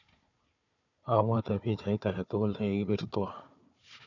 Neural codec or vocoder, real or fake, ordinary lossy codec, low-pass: codec, 16 kHz, 4 kbps, FunCodec, trained on Chinese and English, 50 frames a second; fake; none; 7.2 kHz